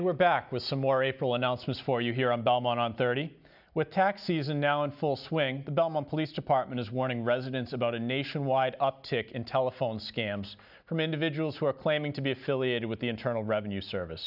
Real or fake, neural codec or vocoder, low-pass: real; none; 5.4 kHz